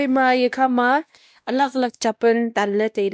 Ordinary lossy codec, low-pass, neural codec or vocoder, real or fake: none; none; codec, 16 kHz, 1 kbps, X-Codec, WavLM features, trained on Multilingual LibriSpeech; fake